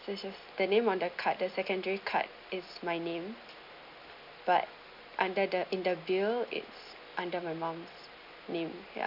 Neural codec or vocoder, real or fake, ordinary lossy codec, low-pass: none; real; none; 5.4 kHz